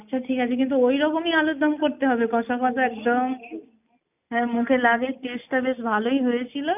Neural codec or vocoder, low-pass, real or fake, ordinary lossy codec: none; 3.6 kHz; real; none